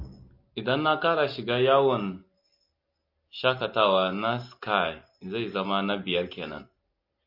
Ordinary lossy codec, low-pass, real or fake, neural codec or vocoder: MP3, 32 kbps; 5.4 kHz; real; none